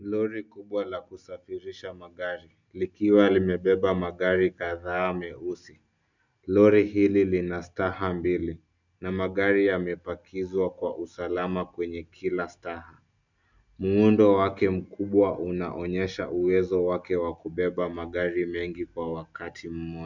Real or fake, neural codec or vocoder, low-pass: real; none; 7.2 kHz